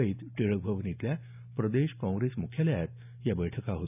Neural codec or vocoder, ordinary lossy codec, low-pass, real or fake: none; none; 3.6 kHz; real